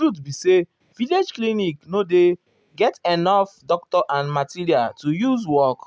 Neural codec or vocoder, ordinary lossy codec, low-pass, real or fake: none; none; none; real